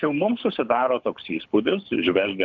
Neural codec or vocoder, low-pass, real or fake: codec, 24 kHz, 6 kbps, HILCodec; 7.2 kHz; fake